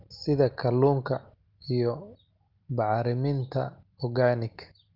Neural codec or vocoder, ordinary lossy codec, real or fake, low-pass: none; Opus, 32 kbps; real; 5.4 kHz